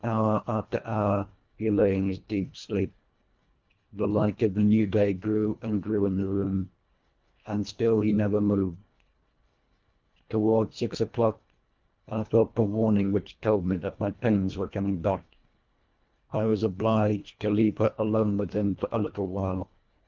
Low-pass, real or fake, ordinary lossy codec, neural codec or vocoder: 7.2 kHz; fake; Opus, 24 kbps; codec, 24 kHz, 1.5 kbps, HILCodec